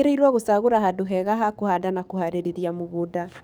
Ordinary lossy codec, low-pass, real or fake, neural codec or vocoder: none; none; fake; codec, 44.1 kHz, 7.8 kbps, DAC